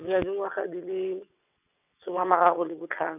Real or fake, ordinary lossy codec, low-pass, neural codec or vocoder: fake; none; 3.6 kHz; vocoder, 22.05 kHz, 80 mel bands, WaveNeXt